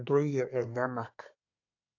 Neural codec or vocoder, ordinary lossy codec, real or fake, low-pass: codec, 24 kHz, 1 kbps, SNAC; AAC, 48 kbps; fake; 7.2 kHz